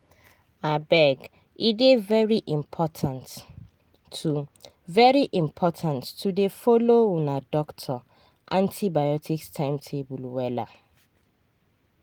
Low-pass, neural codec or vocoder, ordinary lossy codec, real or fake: 19.8 kHz; none; Opus, 24 kbps; real